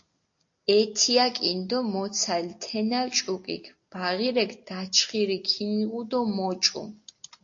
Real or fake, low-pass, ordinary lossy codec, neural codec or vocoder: real; 7.2 kHz; AAC, 48 kbps; none